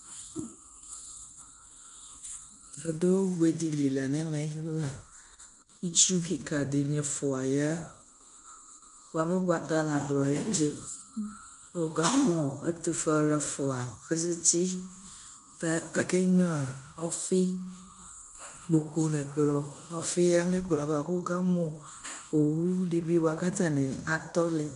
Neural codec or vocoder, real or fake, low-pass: codec, 16 kHz in and 24 kHz out, 0.9 kbps, LongCat-Audio-Codec, fine tuned four codebook decoder; fake; 10.8 kHz